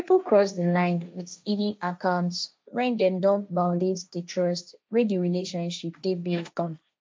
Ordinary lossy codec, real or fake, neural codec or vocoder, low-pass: none; fake; codec, 16 kHz, 1.1 kbps, Voila-Tokenizer; none